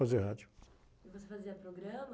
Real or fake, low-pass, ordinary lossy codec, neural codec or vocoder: real; none; none; none